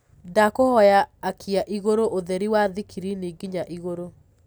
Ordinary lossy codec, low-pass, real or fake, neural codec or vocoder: none; none; real; none